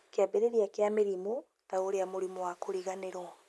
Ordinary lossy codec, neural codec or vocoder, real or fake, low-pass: none; none; real; none